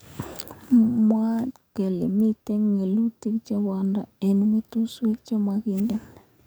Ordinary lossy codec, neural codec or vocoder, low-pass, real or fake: none; codec, 44.1 kHz, 7.8 kbps, DAC; none; fake